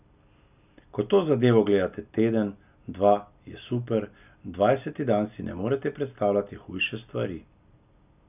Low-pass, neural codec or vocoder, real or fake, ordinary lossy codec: 3.6 kHz; none; real; none